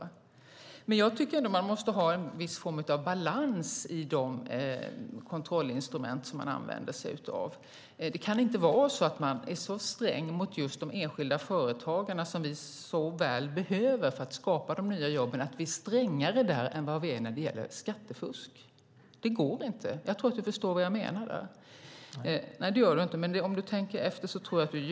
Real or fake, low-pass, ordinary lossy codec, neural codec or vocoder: real; none; none; none